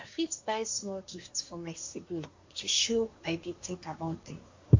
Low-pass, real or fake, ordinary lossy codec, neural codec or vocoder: 7.2 kHz; fake; MP3, 48 kbps; codec, 24 kHz, 1 kbps, SNAC